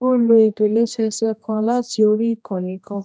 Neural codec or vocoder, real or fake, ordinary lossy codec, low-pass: codec, 16 kHz, 1 kbps, X-Codec, HuBERT features, trained on general audio; fake; none; none